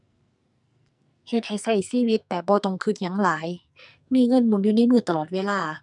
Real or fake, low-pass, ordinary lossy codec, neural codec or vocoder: fake; 10.8 kHz; none; codec, 44.1 kHz, 2.6 kbps, SNAC